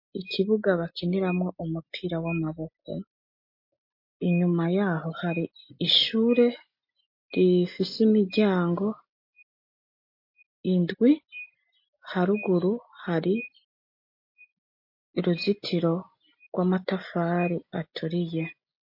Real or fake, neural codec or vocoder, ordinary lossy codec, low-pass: real; none; MP3, 32 kbps; 5.4 kHz